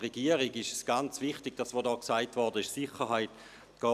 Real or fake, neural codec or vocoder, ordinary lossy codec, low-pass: real; none; none; 14.4 kHz